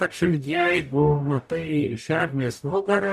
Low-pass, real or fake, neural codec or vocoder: 14.4 kHz; fake; codec, 44.1 kHz, 0.9 kbps, DAC